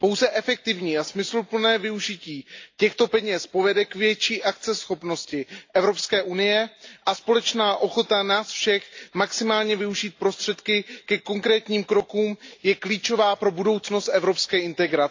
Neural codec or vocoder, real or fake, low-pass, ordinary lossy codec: none; real; 7.2 kHz; AAC, 48 kbps